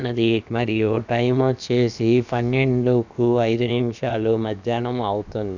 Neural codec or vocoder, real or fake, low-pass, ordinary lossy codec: codec, 16 kHz, about 1 kbps, DyCAST, with the encoder's durations; fake; 7.2 kHz; none